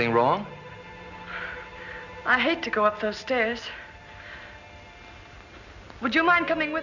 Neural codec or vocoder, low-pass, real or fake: none; 7.2 kHz; real